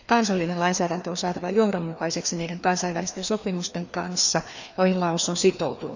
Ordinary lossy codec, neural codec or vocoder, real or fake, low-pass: none; codec, 16 kHz, 2 kbps, FreqCodec, larger model; fake; 7.2 kHz